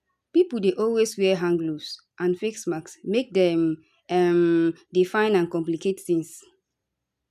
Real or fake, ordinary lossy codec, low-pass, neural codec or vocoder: real; none; 14.4 kHz; none